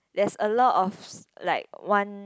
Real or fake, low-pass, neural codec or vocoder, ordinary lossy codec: real; none; none; none